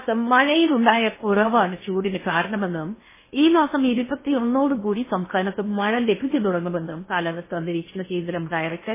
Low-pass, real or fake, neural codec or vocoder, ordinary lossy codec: 3.6 kHz; fake; codec, 16 kHz in and 24 kHz out, 0.8 kbps, FocalCodec, streaming, 65536 codes; MP3, 16 kbps